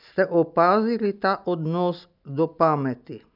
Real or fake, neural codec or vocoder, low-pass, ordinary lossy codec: real; none; 5.4 kHz; none